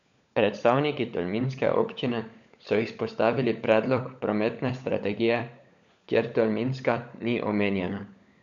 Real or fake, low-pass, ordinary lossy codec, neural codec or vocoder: fake; 7.2 kHz; MP3, 96 kbps; codec, 16 kHz, 16 kbps, FunCodec, trained on LibriTTS, 50 frames a second